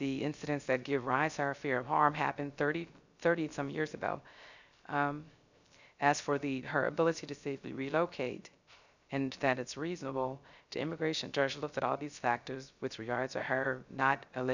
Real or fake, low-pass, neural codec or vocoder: fake; 7.2 kHz; codec, 16 kHz, 0.3 kbps, FocalCodec